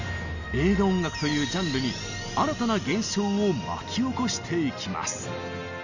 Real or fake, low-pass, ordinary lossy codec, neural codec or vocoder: real; 7.2 kHz; none; none